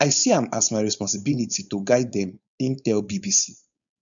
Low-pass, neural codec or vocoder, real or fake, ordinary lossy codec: 7.2 kHz; codec, 16 kHz, 4.8 kbps, FACodec; fake; none